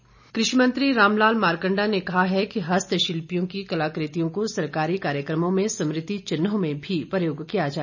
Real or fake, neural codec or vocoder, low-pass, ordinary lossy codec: real; none; 7.2 kHz; none